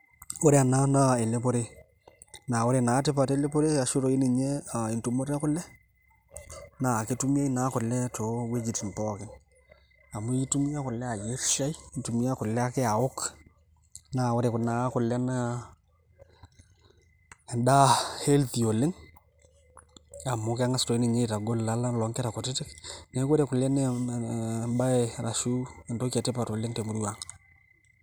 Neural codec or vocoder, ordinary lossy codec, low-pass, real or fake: none; none; none; real